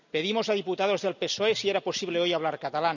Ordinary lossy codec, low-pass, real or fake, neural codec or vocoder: none; 7.2 kHz; real; none